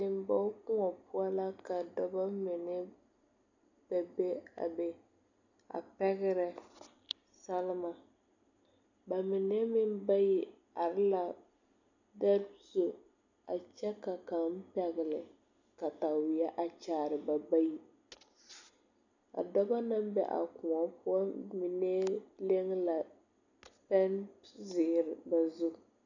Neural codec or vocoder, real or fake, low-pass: none; real; 7.2 kHz